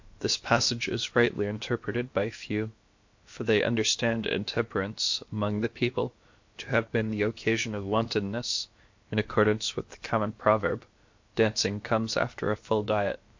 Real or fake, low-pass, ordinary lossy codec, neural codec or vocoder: fake; 7.2 kHz; MP3, 48 kbps; codec, 16 kHz, about 1 kbps, DyCAST, with the encoder's durations